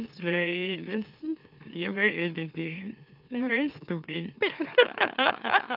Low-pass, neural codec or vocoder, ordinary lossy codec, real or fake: 5.4 kHz; autoencoder, 44.1 kHz, a latent of 192 numbers a frame, MeloTTS; none; fake